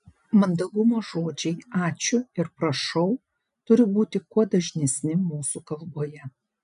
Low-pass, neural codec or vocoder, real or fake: 10.8 kHz; none; real